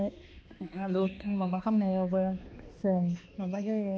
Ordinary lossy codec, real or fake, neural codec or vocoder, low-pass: none; fake; codec, 16 kHz, 2 kbps, X-Codec, HuBERT features, trained on balanced general audio; none